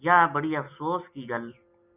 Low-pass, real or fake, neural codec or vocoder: 3.6 kHz; real; none